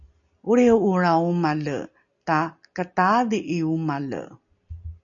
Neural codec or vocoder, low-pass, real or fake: none; 7.2 kHz; real